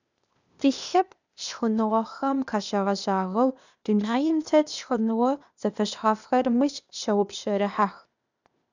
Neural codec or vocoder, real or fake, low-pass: codec, 16 kHz, 0.8 kbps, ZipCodec; fake; 7.2 kHz